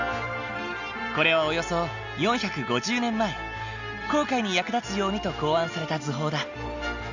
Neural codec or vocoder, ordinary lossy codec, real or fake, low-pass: none; none; real; 7.2 kHz